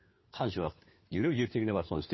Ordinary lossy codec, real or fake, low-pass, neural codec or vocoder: MP3, 24 kbps; fake; 7.2 kHz; codec, 16 kHz, 4 kbps, FunCodec, trained on LibriTTS, 50 frames a second